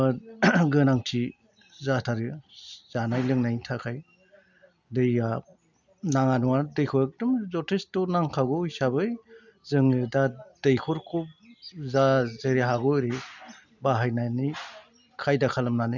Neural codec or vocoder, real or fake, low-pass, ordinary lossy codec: none; real; 7.2 kHz; none